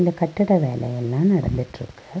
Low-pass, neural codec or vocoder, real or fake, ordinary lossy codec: none; none; real; none